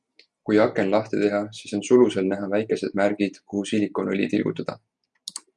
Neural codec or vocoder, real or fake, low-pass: none; real; 10.8 kHz